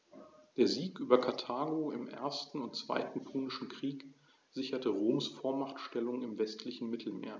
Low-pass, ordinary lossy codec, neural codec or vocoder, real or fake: none; none; none; real